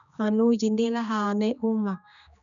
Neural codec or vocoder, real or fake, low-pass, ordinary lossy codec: codec, 16 kHz, 2 kbps, X-Codec, HuBERT features, trained on general audio; fake; 7.2 kHz; none